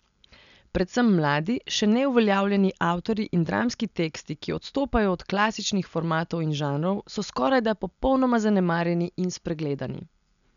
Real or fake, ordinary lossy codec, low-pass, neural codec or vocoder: real; none; 7.2 kHz; none